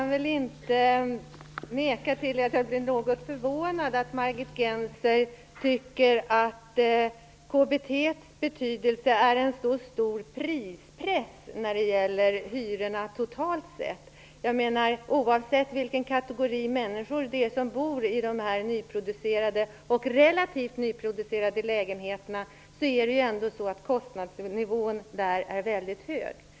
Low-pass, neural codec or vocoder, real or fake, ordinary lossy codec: none; none; real; none